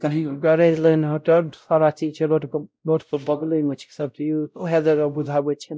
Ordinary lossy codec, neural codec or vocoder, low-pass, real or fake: none; codec, 16 kHz, 0.5 kbps, X-Codec, WavLM features, trained on Multilingual LibriSpeech; none; fake